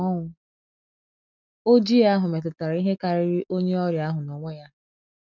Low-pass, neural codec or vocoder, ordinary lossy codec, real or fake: 7.2 kHz; none; none; real